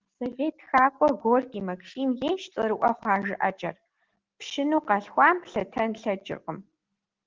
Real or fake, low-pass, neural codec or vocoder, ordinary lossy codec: real; 7.2 kHz; none; Opus, 16 kbps